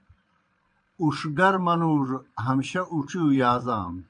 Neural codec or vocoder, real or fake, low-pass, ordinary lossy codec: vocoder, 22.05 kHz, 80 mel bands, Vocos; fake; 9.9 kHz; MP3, 64 kbps